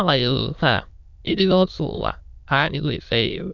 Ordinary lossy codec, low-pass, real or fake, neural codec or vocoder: none; 7.2 kHz; fake; autoencoder, 22.05 kHz, a latent of 192 numbers a frame, VITS, trained on many speakers